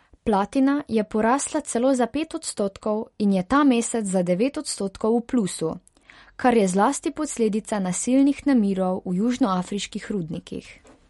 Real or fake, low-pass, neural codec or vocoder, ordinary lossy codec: real; 19.8 kHz; none; MP3, 48 kbps